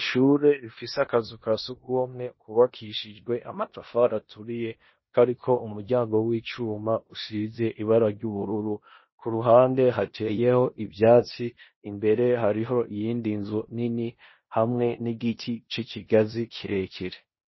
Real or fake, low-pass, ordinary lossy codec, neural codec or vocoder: fake; 7.2 kHz; MP3, 24 kbps; codec, 16 kHz in and 24 kHz out, 0.9 kbps, LongCat-Audio-Codec, four codebook decoder